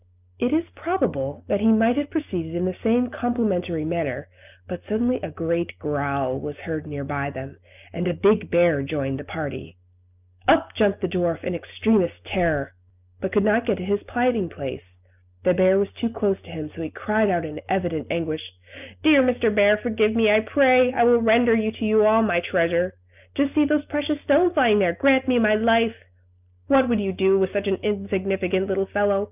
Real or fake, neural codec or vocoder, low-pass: real; none; 3.6 kHz